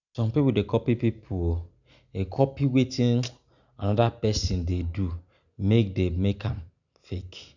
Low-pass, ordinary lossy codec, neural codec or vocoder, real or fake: 7.2 kHz; none; none; real